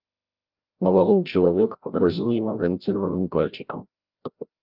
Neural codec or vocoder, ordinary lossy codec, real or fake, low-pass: codec, 16 kHz, 0.5 kbps, FreqCodec, larger model; Opus, 32 kbps; fake; 5.4 kHz